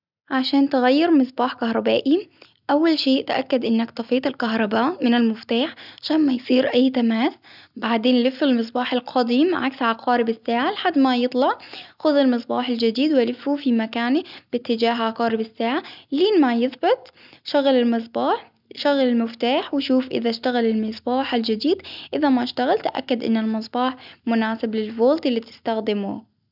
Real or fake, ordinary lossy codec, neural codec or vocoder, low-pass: real; none; none; 5.4 kHz